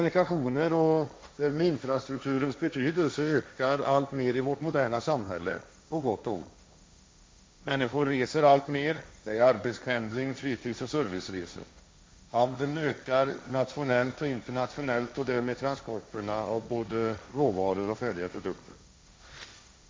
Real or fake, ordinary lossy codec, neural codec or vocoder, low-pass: fake; none; codec, 16 kHz, 1.1 kbps, Voila-Tokenizer; none